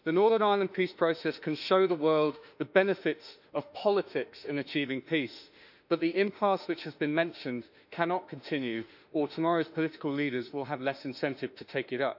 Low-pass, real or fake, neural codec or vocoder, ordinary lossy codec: 5.4 kHz; fake; autoencoder, 48 kHz, 32 numbers a frame, DAC-VAE, trained on Japanese speech; none